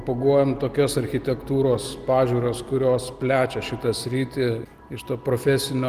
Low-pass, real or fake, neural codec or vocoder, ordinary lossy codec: 14.4 kHz; real; none; Opus, 24 kbps